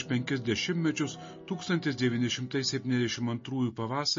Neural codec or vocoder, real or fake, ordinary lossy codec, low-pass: none; real; MP3, 32 kbps; 7.2 kHz